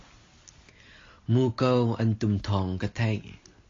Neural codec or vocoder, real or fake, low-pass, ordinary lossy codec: none; real; 7.2 kHz; AAC, 32 kbps